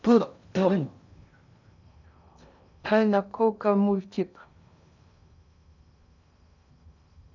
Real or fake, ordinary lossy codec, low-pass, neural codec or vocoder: fake; none; 7.2 kHz; codec, 16 kHz in and 24 kHz out, 0.6 kbps, FocalCodec, streaming, 2048 codes